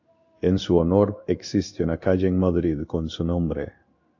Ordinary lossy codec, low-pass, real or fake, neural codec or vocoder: AAC, 48 kbps; 7.2 kHz; fake; codec, 16 kHz in and 24 kHz out, 1 kbps, XY-Tokenizer